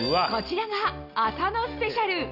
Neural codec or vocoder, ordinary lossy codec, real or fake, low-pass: none; none; real; 5.4 kHz